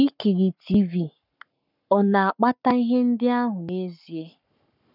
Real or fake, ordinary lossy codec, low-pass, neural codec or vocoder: fake; none; 5.4 kHz; codec, 44.1 kHz, 7.8 kbps, Pupu-Codec